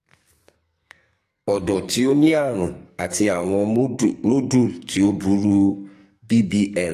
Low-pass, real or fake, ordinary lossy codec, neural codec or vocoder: 14.4 kHz; fake; AAC, 64 kbps; codec, 44.1 kHz, 2.6 kbps, SNAC